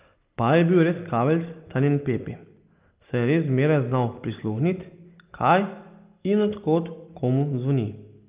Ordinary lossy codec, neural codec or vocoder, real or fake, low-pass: Opus, 24 kbps; none; real; 3.6 kHz